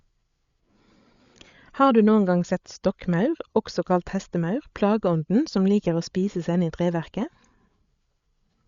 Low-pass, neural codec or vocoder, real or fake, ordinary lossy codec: 7.2 kHz; codec, 16 kHz, 8 kbps, FreqCodec, larger model; fake; Opus, 64 kbps